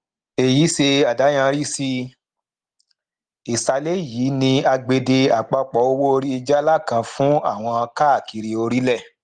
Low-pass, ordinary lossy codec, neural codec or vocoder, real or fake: 9.9 kHz; Opus, 24 kbps; none; real